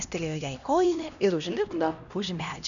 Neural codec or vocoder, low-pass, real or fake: codec, 16 kHz, 1 kbps, X-Codec, HuBERT features, trained on LibriSpeech; 7.2 kHz; fake